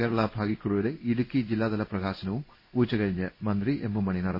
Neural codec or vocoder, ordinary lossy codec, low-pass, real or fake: codec, 16 kHz in and 24 kHz out, 1 kbps, XY-Tokenizer; MP3, 24 kbps; 5.4 kHz; fake